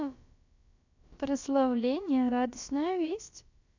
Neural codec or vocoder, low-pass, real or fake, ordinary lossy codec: codec, 16 kHz, about 1 kbps, DyCAST, with the encoder's durations; 7.2 kHz; fake; none